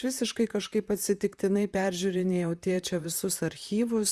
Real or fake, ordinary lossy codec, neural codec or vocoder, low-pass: fake; Opus, 64 kbps; vocoder, 44.1 kHz, 128 mel bands, Pupu-Vocoder; 14.4 kHz